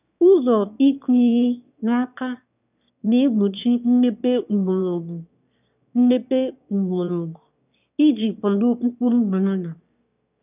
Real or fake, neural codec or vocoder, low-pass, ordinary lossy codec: fake; autoencoder, 22.05 kHz, a latent of 192 numbers a frame, VITS, trained on one speaker; 3.6 kHz; none